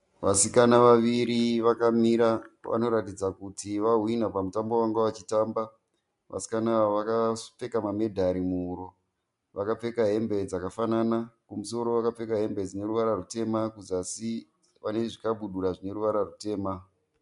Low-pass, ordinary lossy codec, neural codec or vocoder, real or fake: 10.8 kHz; MP3, 64 kbps; none; real